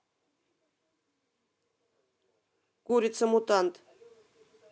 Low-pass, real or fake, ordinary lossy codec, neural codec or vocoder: none; real; none; none